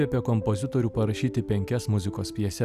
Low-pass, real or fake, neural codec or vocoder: 14.4 kHz; real; none